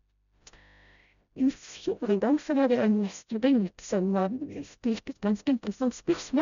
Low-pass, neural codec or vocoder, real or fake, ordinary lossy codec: 7.2 kHz; codec, 16 kHz, 0.5 kbps, FreqCodec, smaller model; fake; none